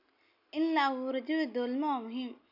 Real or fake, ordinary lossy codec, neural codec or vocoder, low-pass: real; none; none; 5.4 kHz